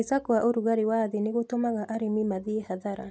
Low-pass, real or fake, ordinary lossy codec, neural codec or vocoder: none; real; none; none